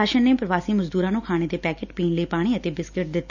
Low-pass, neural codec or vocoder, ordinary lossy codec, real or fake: 7.2 kHz; none; none; real